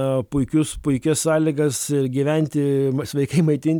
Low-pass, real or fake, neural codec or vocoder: 19.8 kHz; real; none